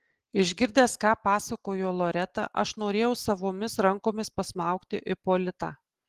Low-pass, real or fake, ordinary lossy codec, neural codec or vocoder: 14.4 kHz; real; Opus, 16 kbps; none